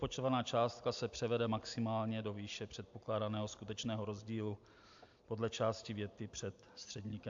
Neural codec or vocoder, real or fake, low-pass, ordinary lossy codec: none; real; 7.2 kHz; AAC, 64 kbps